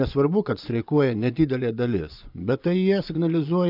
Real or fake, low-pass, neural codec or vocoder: fake; 5.4 kHz; vocoder, 24 kHz, 100 mel bands, Vocos